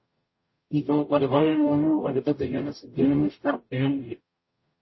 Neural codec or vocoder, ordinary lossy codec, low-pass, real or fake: codec, 44.1 kHz, 0.9 kbps, DAC; MP3, 24 kbps; 7.2 kHz; fake